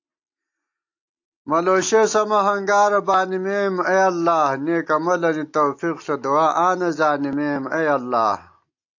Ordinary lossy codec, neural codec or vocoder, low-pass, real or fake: AAC, 48 kbps; none; 7.2 kHz; real